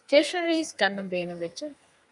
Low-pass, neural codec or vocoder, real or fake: 10.8 kHz; codec, 32 kHz, 1.9 kbps, SNAC; fake